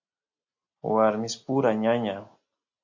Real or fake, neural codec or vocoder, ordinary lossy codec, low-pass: real; none; MP3, 48 kbps; 7.2 kHz